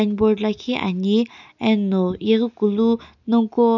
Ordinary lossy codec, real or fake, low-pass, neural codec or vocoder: none; real; 7.2 kHz; none